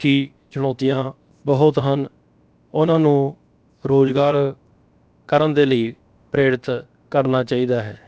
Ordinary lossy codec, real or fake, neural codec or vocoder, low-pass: none; fake; codec, 16 kHz, 0.7 kbps, FocalCodec; none